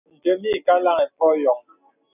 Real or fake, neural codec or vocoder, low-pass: real; none; 3.6 kHz